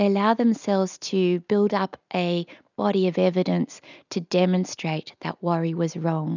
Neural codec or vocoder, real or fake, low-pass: none; real; 7.2 kHz